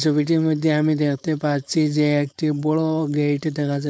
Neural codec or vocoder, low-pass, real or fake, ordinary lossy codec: codec, 16 kHz, 4.8 kbps, FACodec; none; fake; none